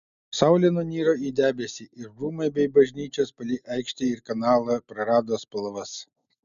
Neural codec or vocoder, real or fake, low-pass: none; real; 7.2 kHz